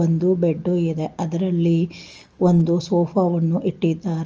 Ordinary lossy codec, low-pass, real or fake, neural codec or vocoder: Opus, 24 kbps; 7.2 kHz; real; none